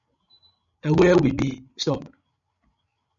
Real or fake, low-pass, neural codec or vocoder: fake; 7.2 kHz; codec, 16 kHz, 16 kbps, FreqCodec, larger model